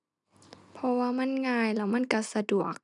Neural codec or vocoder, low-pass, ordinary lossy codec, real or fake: none; 10.8 kHz; none; real